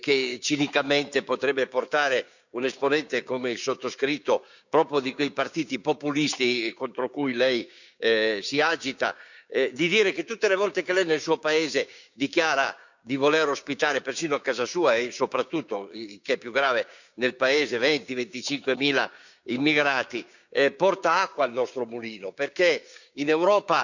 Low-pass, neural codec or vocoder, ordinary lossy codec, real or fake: 7.2 kHz; codec, 16 kHz, 6 kbps, DAC; none; fake